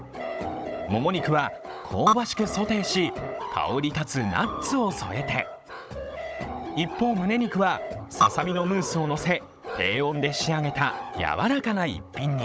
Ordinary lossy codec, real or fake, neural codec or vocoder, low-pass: none; fake; codec, 16 kHz, 16 kbps, FunCodec, trained on Chinese and English, 50 frames a second; none